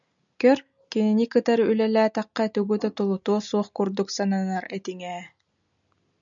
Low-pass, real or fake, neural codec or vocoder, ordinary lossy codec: 7.2 kHz; real; none; MP3, 96 kbps